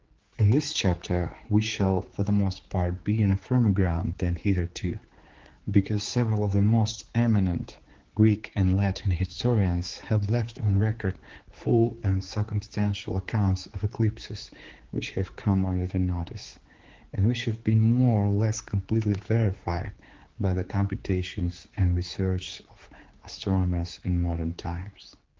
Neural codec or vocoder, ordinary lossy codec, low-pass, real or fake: codec, 16 kHz, 4 kbps, X-Codec, HuBERT features, trained on general audio; Opus, 16 kbps; 7.2 kHz; fake